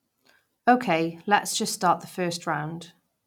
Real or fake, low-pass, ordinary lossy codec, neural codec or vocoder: real; 19.8 kHz; none; none